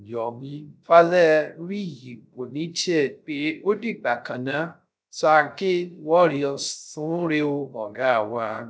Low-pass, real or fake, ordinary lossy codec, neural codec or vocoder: none; fake; none; codec, 16 kHz, 0.3 kbps, FocalCodec